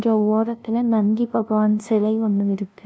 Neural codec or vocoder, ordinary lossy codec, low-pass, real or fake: codec, 16 kHz, 0.5 kbps, FunCodec, trained on LibriTTS, 25 frames a second; none; none; fake